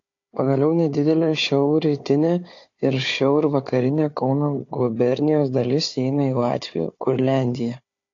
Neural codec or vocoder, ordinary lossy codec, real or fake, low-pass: codec, 16 kHz, 4 kbps, FunCodec, trained on Chinese and English, 50 frames a second; AAC, 48 kbps; fake; 7.2 kHz